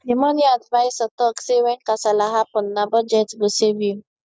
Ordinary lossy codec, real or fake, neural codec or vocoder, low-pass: none; real; none; none